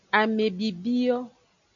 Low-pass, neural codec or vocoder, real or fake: 7.2 kHz; none; real